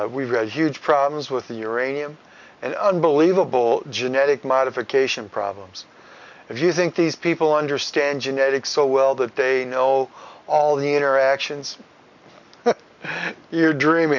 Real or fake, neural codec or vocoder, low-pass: real; none; 7.2 kHz